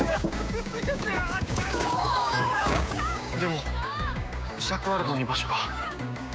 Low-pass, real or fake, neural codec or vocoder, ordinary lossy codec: none; fake; codec, 16 kHz, 6 kbps, DAC; none